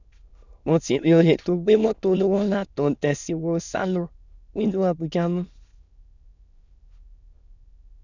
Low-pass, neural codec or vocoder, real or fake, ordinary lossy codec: 7.2 kHz; autoencoder, 22.05 kHz, a latent of 192 numbers a frame, VITS, trained on many speakers; fake; none